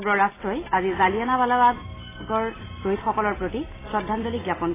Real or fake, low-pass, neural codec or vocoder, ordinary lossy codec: real; 3.6 kHz; none; AAC, 16 kbps